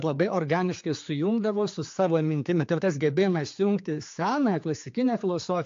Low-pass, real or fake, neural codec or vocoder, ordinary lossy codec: 7.2 kHz; fake; codec, 16 kHz, 4 kbps, X-Codec, HuBERT features, trained on general audio; AAC, 48 kbps